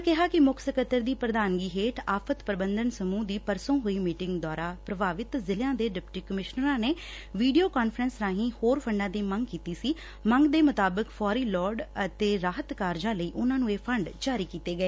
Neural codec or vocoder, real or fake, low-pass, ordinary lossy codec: none; real; none; none